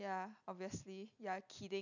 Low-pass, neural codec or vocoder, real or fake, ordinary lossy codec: 7.2 kHz; none; real; none